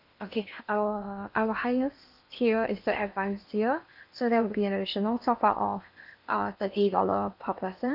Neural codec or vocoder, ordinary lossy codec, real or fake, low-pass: codec, 16 kHz in and 24 kHz out, 0.8 kbps, FocalCodec, streaming, 65536 codes; none; fake; 5.4 kHz